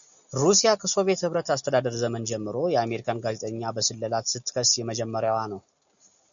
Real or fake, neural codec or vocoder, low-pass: real; none; 7.2 kHz